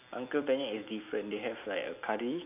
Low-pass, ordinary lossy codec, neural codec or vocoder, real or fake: 3.6 kHz; none; none; real